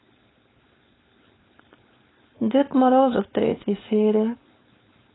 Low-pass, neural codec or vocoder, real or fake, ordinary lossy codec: 7.2 kHz; codec, 16 kHz, 4.8 kbps, FACodec; fake; AAC, 16 kbps